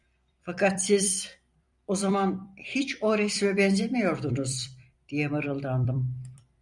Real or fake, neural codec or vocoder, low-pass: fake; vocoder, 44.1 kHz, 128 mel bands every 256 samples, BigVGAN v2; 10.8 kHz